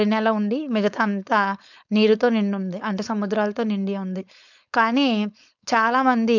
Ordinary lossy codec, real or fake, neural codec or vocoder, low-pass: none; fake; codec, 16 kHz, 4.8 kbps, FACodec; 7.2 kHz